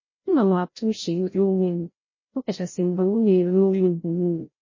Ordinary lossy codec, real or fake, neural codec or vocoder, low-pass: MP3, 32 kbps; fake; codec, 16 kHz, 0.5 kbps, FreqCodec, larger model; 7.2 kHz